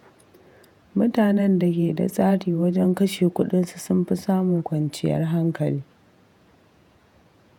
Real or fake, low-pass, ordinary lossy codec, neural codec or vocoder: fake; 19.8 kHz; none; vocoder, 48 kHz, 128 mel bands, Vocos